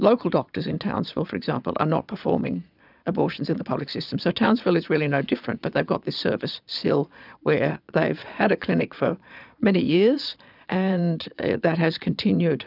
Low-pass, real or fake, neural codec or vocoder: 5.4 kHz; fake; vocoder, 22.05 kHz, 80 mel bands, Vocos